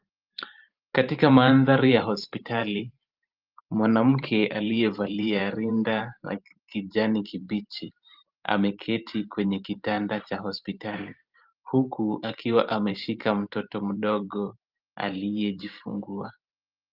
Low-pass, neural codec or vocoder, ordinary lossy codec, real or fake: 5.4 kHz; vocoder, 44.1 kHz, 128 mel bands every 512 samples, BigVGAN v2; Opus, 24 kbps; fake